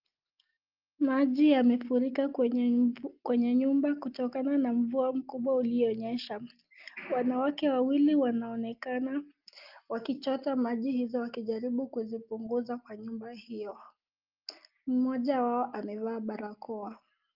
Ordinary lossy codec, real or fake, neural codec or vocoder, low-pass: Opus, 24 kbps; real; none; 5.4 kHz